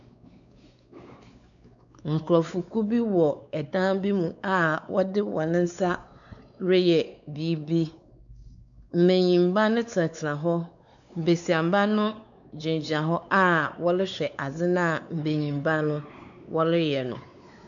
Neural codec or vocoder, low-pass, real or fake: codec, 16 kHz, 4 kbps, X-Codec, WavLM features, trained on Multilingual LibriSpeech; 7.2 kHz; fake